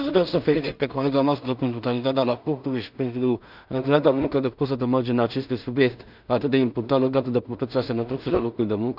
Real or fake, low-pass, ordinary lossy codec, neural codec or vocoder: fake; 5.4 kHz; none; codec, 16 kHz in and 24 kHz out, 0.4 kbps, LongCat-Audio-Codec, two codebook decoder